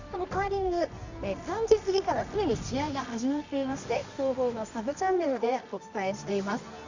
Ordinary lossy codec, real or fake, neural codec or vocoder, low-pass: Opus, 64 kbps; fake; codec, 24 kHz, 0.9 kbps, WavTokenizer, medium music audio release; 7.2 kHz